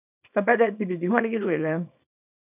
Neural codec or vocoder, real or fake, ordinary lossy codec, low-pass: codec, 24 kHz, 0.9 kbps, WavTokenizer, small release; fake; none; 3.6 kHz